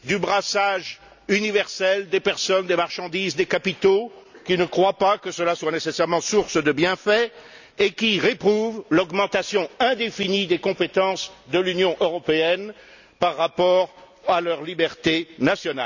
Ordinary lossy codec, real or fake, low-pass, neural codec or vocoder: none; real; 7.2 kHz; none